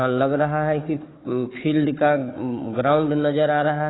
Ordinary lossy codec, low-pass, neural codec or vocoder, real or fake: AAC, 16 kbps; 7.2 kHz; codec, 16 kHz, 4 kbps, FunCodec, trained on Chinese and English, 50 frames a second; fake